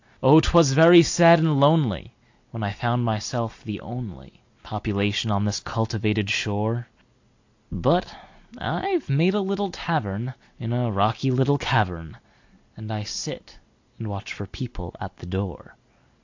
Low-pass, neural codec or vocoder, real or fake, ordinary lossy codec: 7.2 kHz; none; real; AAC, 48 kbps